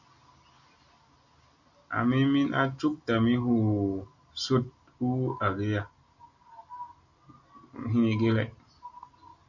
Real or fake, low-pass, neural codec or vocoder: real; 7.2 kHz; none